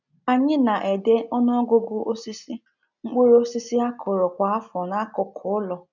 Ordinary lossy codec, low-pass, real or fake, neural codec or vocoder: none; 7.2 kHz; real; none